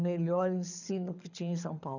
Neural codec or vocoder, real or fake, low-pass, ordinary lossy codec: codec, 24 kHz, 6 kbps, HILCodec; fake; 7.2 kHz; none